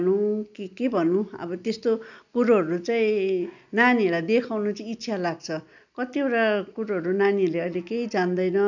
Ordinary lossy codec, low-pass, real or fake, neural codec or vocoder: none; 7.2 kHz; real; none